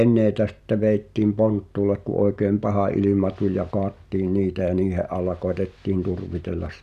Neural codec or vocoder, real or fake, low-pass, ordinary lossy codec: none; real; 14.4 kHz; none